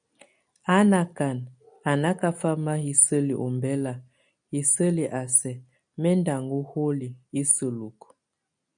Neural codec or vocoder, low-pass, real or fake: none; 9.9 kHz; real